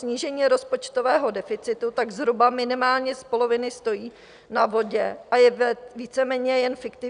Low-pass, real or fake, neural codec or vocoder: 9.9 kHz; real; none